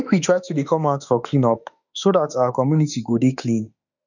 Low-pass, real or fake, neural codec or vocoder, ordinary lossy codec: 7.2 kHz; fake; autoencoder, 48 kHz, 32 numbers a frame, DAC-VAE, trained on Japanese speech; none